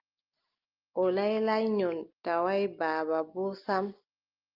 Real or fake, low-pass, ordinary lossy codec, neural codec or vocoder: real; 5.4 kHz; Opus, 24 kbps; none